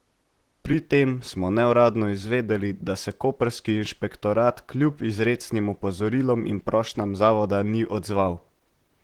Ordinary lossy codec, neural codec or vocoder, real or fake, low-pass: Opus, 16 kbps; vocoder, 44.1 kHz, 128 mel bands, Pupu-Vocoder; fake; 19.8 kHz